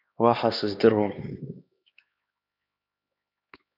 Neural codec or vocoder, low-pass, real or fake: codec, 16 kHz, 2 kbps, X-Codec, HuBERT features, trained on LibriSpeech; 5.4 kHz; fake